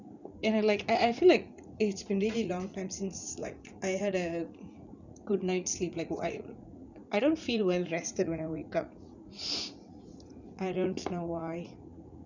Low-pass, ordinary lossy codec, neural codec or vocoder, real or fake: 7.2 kHz; none; vocoder, 44.1 kHz, 80 mel bands, Vocos; fake